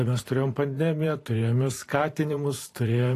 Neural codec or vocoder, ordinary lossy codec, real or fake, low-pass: vocoder, 44.1 kHz, 128 mel bands every 512 samples, BigVGAN v2; AAC, 48 kbps; fake; 14.4 kHz